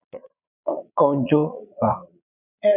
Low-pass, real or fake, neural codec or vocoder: 3.6 kHz; fake; vocoder, 22.05 kHz, 80 mel bands, Vocos